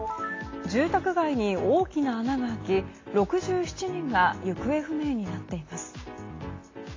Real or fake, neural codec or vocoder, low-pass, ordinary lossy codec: real; none; 7.2 kHz; AAC, 32 kbps